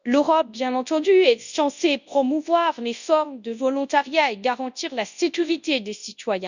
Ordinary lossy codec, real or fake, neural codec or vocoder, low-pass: none; fake; codec, 24 kHz, 0.9 kbps, WavTokenizer, large speech release; 7.2 kHz